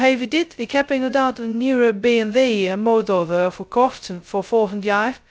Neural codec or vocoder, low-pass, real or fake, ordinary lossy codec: codec, 16 kHz, 0.2 kbps, FocalCodec; none; fake; none